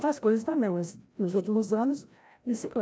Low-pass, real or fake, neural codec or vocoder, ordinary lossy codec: none; fake; codec, 16 kHz, 0.5 kbps, FreqCodec, larger model; none